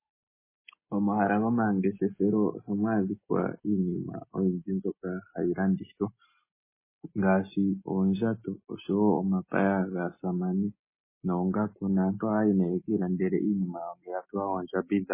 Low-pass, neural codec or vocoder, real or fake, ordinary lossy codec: 3.6 kHz; none; real; MP3, 16 kbps